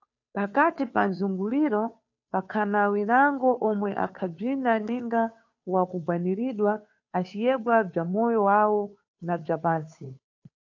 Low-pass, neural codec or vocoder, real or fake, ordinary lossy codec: 7.2 kHz; codec, 16 kHz, 2 kbps, FunCodec, trained on Chinese and English, 25 frames a second; fake; AAC, 48 kbps